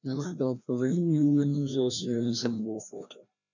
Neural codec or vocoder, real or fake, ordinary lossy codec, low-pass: codec, 16 kHz, 1 kbps, FreqCodec, larger model; fake; none; 7.2 kHz